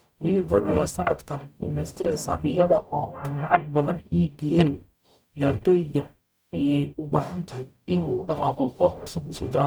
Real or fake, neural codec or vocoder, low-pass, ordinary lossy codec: fake; codec, 44.1 kHz, 0.9 kbps, DAC; none; none